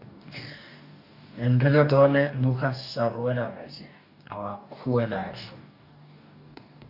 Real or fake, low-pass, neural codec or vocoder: fake; 5.4 kHz; codec, 44.1 kHz, 2.6 kbps, DAC